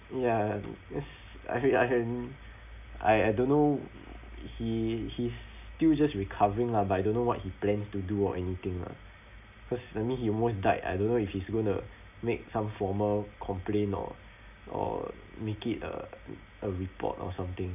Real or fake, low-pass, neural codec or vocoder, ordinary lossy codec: real; 3.6 kHz; none; none